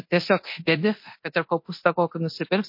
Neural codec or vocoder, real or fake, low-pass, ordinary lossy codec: codec, 24 kHz, 0.9 kbps, DualCodec; fake; 5.4 kHz; MP3, 32 kbps